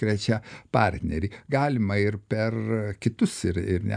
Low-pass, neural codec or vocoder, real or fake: 9.9 kHz; none; real